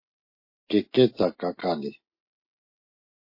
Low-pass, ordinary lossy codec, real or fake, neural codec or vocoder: 5.4 kHz; MP3, 32 kbps; real; none